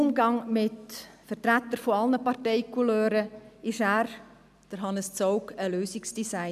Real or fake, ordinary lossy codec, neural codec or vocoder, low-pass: real; none; none; 14.4 kHz